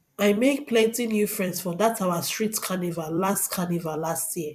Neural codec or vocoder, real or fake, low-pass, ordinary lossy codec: vocoder, 44.1 kHz, 128 mel bands every 256 samples, BigVGAN v2; fake; 14.4 kHz; AAC, 96 kbps